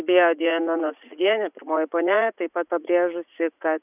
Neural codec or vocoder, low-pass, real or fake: vocoder, 44.1 kHz, 80 mel bands, Vocos; 3.6 kHz; fake